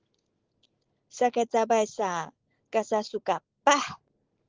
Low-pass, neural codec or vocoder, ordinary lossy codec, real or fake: 7.2 kHz; none; Opus, 16 kbps; real